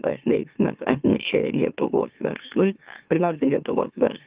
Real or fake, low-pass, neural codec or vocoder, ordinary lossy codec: fake; 3.6 kHz; autoencoder, 44.1 kHz, a latent of 192 numbers a frame, MeloTTS; Opus, 24 kbps